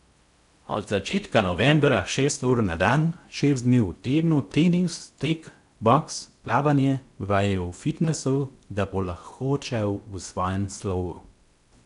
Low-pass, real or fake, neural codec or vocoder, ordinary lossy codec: 10.8 kHz; fake; codec, 16 kHz in and 24 kHz out, 0.6 kbps, FocalCodec, streaming, 4096 codes; none